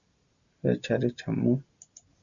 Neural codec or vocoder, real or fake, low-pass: none; real; 7.2 kHz